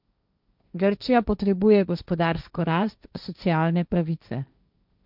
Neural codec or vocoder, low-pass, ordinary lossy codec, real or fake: codec, 16 kHz, 1.1 kbps, Voila-Tokenizer; 5.4 kHz; none; fake